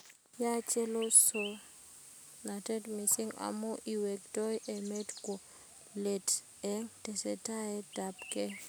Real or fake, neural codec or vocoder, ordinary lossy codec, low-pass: real; none; none; none